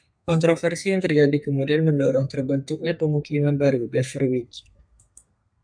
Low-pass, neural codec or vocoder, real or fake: 9.9 kHz; codec, 32 kHz, 1.9 kbps, SNAC; fake